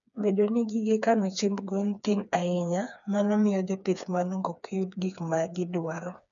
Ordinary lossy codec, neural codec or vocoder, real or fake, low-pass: none; codec, 16 kHz, 4 kbps, FreqCodec, smaller model; fake; 7.2 kHz